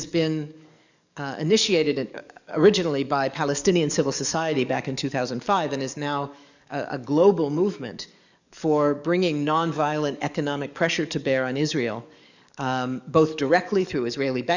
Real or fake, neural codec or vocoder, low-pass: fake; codec, 44.1 kHz, 7.8 kbps, DAC; 7.2 kHz